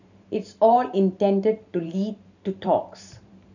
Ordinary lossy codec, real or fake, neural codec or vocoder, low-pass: none; fake; vocoder, 44.1 kHz, 128 mel bands every 512 samples, BigVGAN v2; 7.2 kHz